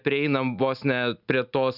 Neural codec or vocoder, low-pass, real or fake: none; 5.4 kHz; real